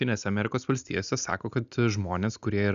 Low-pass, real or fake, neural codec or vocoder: 7.2 kHz; real; none